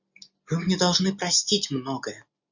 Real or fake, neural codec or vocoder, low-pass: real; none; 7.2 kHz